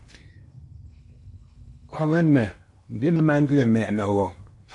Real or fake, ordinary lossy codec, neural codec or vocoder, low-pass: fake; MP3, 48 kbps; codec, 16 kHz in and 24 kHz out, 0.8 kbps, FocalCodec, streaming, 65536 codes; 10.8 kHz